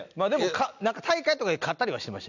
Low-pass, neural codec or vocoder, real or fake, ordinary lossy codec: 7.2 kHz; none; real; none